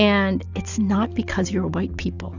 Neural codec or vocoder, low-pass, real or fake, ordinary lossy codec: none; 7.2 kHz; real; Opus, 64 kbps